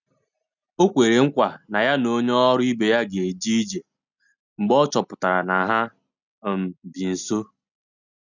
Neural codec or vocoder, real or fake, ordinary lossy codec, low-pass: none; real; none; 7.2 kHz